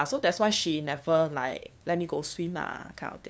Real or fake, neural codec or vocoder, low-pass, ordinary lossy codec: fake; codec, 16 kHz, 2 kbps, FunCodec, trained on LibriTTS, 25 frames a second; none; none